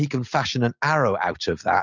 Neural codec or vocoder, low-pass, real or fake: none; 7.2 kHz; real